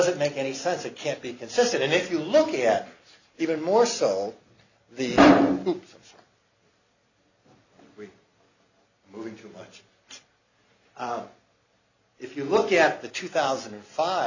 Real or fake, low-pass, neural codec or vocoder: real; 7.2 kHz; none